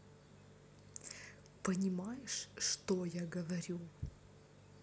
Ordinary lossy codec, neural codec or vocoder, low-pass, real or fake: none; none; none; real